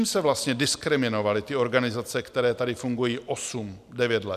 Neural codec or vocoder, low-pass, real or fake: none; 14.4 kHz; real